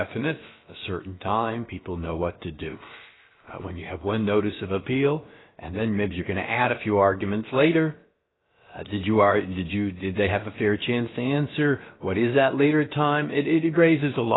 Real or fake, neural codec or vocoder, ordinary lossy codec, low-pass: fake; codec, 16 kHz, about 1 kbps, DyCAST, with the encoder's durations; AAC, 16 kbps; 7.2 kHz